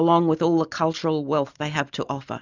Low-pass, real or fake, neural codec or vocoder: 7.2 kHz; real; none